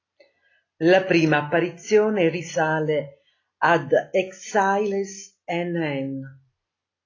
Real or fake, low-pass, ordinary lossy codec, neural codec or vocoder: real; 7.2 kHz; AAC, 32 kbps; none